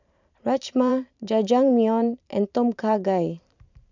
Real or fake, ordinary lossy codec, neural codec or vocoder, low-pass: fake; none; vocoder, 44.1 kHz, 128 mel bands every 512 samples, BigVGAN v2; 7.2 kHz